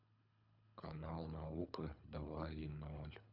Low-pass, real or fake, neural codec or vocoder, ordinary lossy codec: 5.4 kHz; fake; codec, 24 kHz, 3 kbps, HILCodec; none